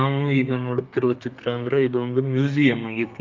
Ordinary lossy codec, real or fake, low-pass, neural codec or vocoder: Opus, 24 kbps; fake; 7.2 kHz; codec, 32 kHz, 1.9 kbps, SNAC